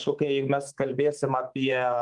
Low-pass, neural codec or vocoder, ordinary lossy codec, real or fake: 10.8 kHz; codec, 24 kHz, 3.1 kbps, DualCodec; Opus, 24 kbps; fake